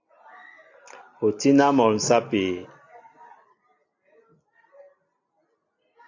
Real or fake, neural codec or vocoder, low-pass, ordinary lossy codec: real; none; 7.2 kHz; AAC, 48 kbps